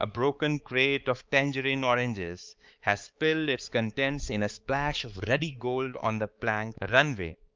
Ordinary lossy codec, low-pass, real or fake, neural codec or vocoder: Opus, 24 kbps; 7.2 kHz; fake; codec, 16 kHz, 4 kbps, X-Codec, HuBERT features, trained on balanced general audio